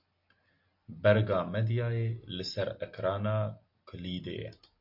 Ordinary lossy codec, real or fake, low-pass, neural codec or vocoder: MP3, 48 kbps; real; 5.4 kHz; none